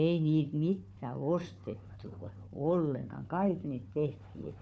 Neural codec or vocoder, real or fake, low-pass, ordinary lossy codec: codec, 16 kHz, 4 kbps, FunCodec, trained on Chinese and English, 50 frames a second; fake; none; none